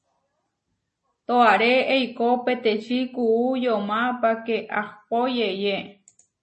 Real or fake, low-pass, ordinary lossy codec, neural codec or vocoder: real; 10.8 kHz; MP3, 32 kbps; none